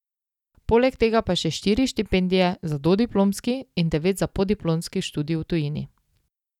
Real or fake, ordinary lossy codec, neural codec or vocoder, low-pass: real; none; none; 19.8 kHz